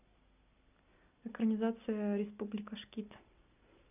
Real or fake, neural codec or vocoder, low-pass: real; none; 3.6 kHz